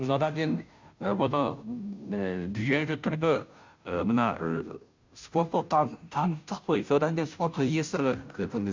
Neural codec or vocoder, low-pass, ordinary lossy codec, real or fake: codec, 16 kHz, 0.5 kbps, FunCodec, trained on Chinese and English, 25 frames a second; 7.2 kHz; MP3, 64 kbps; fake